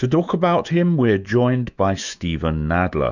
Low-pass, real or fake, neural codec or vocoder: 7.2 kHz; fake; autoencoder, 48 kHz, 128 numbers a frame, DAC-VAE, trained on Japanese speech